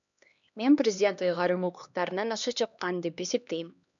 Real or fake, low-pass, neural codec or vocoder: fake; 7.2 kHz; codec, 16 kHz, 2 kbps, X-Codec, HuBERT features, trained on LibriSpeech